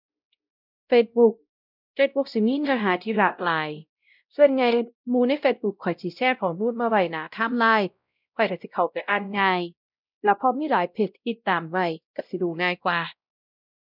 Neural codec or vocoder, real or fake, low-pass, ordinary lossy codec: codec, 16 kHz, 0.5 kbps, X-Codec, WavLM features, trained on Multilingual LibriSpeech; fake; 5.4 kHz; none